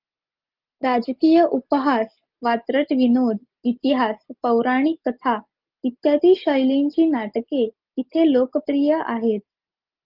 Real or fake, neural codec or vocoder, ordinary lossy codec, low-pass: real; none; Opus, 32 kbps; 5.4 kHz